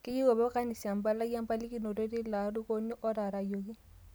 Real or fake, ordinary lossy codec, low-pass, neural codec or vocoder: real; none; none; none